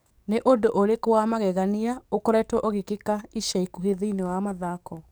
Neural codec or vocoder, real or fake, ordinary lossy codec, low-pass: codec, 44.1 kHz, 7.8 kbps, DAC; fake; none; none